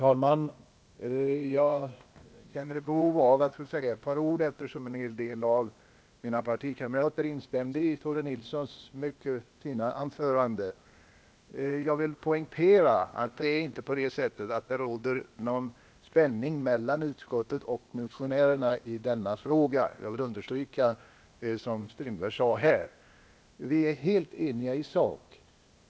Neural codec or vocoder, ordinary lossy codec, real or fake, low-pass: codec, 16 kHz, 0.8 kbps, ZipCodec; none; fake; none